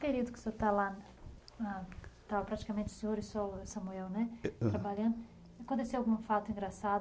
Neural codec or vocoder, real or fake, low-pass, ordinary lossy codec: none; real; none; none